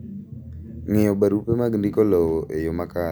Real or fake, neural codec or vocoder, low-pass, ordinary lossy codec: real; none; none; none